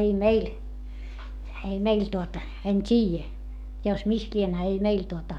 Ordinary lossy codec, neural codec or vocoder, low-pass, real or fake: none; codec, 44.1 kHz, 7.8 kbps, DAC; 19.8 kHz; fake